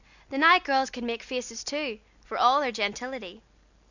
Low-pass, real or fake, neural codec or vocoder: 7.2 kHz; real; none